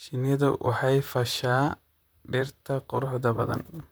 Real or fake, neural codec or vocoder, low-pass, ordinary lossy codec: fake; vocoder, 44.1 kHz, 128 mel bands, Pupu-Vocoder; none; none